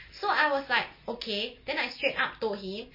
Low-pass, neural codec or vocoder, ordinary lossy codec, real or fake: 5.4 kHz; none; MP3, 24 kbps; real